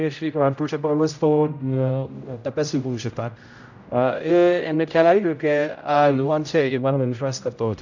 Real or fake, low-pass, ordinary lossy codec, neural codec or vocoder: fake; 7.2 kHz; none; codec, 16 kHz, 0.5 kbps, X-Codec, HuBERT features, trained on general audio